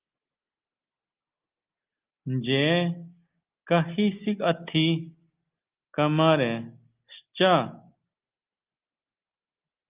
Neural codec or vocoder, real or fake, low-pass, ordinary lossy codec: none; real; 3.6 kHz; Opus, 24 kbps